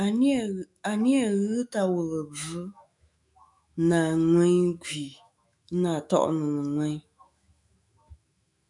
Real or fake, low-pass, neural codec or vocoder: fake; 10.8 kHz; autoencoder, 48 kHz, 128 numbers a frame, DAC-VAE, trained on Japanese speech